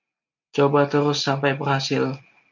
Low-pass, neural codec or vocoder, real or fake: 7.2 kHz; none; real